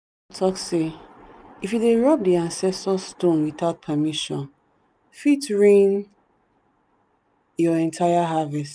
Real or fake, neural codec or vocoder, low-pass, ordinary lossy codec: real; none; none; none